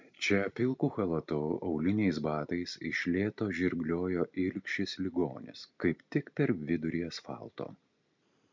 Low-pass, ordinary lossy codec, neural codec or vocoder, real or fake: 7.2 kHz; MP3, 64 kbps; none; real